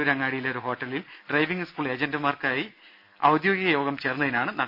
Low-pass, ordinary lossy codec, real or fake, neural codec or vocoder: 5.4 kHz; none; real; none